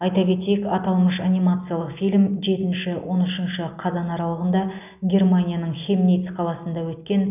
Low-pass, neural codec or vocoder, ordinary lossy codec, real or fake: 3.6 kHz; none; none; real